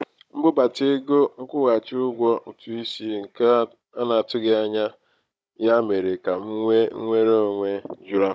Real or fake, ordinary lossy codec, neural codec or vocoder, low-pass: fake; none; codec, 16 kHz, 16 kbps, FunCodec, trained on Chinese and English, 50 frames a second; none